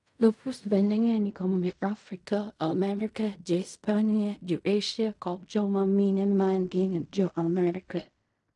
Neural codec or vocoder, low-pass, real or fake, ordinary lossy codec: codec, 16 kHz in and 24 kHz out, 0.4 kbps, LongCat-Audio-Codec, fine tuned four codebook decoder; 10.8 kHz; fake; none